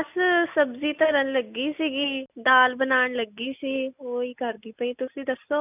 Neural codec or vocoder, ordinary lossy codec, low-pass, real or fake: none; none; 3.6 kHz; real